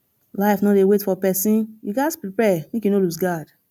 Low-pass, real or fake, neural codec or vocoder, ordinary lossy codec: 19.8 kHz; real; none; none